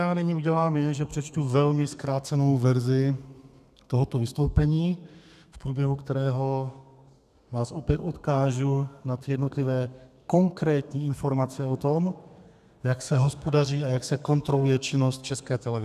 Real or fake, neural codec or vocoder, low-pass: fake; codec, 32 kHz, 1.9 kbps, SNAC; 14.4 kHz